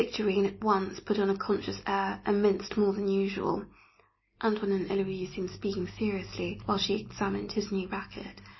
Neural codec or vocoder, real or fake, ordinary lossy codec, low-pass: none; real; MP3, 24 kbps; 7.2 kHz